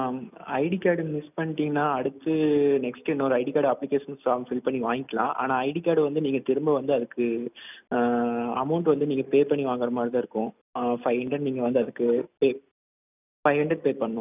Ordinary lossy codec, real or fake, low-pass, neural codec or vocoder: none; real; 3.6 kHz; none